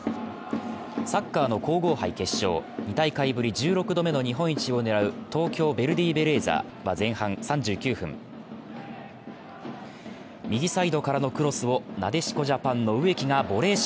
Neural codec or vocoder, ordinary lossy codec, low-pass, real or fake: none; none; none; real